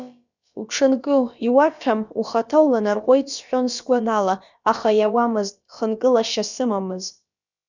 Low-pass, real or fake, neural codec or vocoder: 7.2 kHz; fake; codec, 16 kHz, about 1 kbps, DyCAST, with the encoder's durations